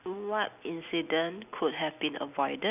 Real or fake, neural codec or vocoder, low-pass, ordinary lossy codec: real; none; 3.6 kHz; none